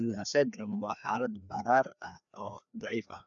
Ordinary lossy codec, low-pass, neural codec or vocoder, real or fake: none; 7.2 kHz; codec, 16 kHz, 2 kbps, FreqCodec, larger model; fake